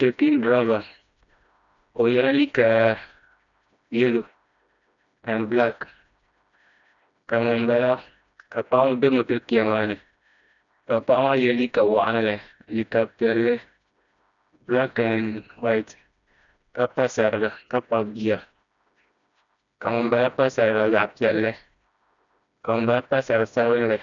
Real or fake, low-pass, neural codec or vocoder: fake; 7.2 kHz; codec, 16 kHz, 1 kbps, FreqCodec, smaller model